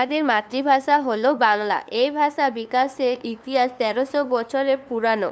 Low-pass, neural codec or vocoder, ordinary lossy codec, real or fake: none; codec, 16 kHz, 2 kbps, FunCodec, trained on LibriTTS, 25 frames a second; none; fake